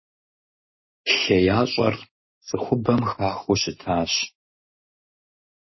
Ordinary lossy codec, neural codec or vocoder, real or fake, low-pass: MP3, 24 kbps; none; real; 7.2 kHz